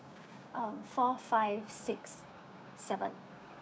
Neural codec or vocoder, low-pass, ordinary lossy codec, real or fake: codec, 16 kHz, 6 kbps, DAC; none; none; fake